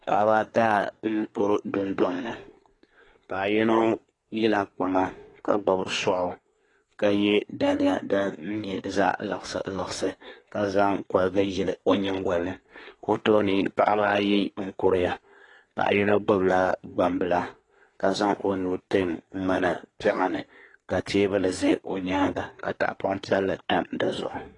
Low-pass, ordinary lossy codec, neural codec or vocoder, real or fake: 10.8 kHz; AAC, 32 kbps; codec, 24 kHz, 1 kbps, SNAC; fake